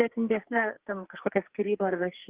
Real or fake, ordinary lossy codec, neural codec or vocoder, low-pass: fake; Opus, 16 kbps; codec, 44.1 kHz, 2.6 kbps, SNAC; 3.6 kHz